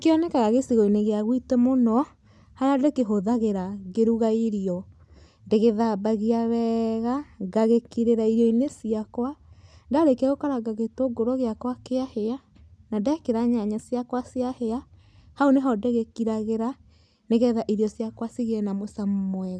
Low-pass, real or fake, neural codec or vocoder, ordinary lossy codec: none; real; none; none